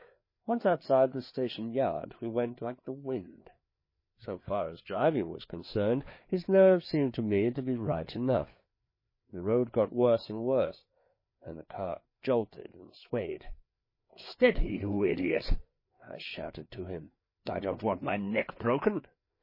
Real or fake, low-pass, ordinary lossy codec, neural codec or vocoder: fake; 5.4 kHz; MP3, 24 kbps; codec, 16 kHz, 4 kbps, FreqCodec, larger model